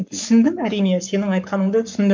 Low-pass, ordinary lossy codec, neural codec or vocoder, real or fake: 7.2 kHz; MP3, 64 kbps; codec, 44.1 kHz, 7.8 kbps, Pupu-Codec; fake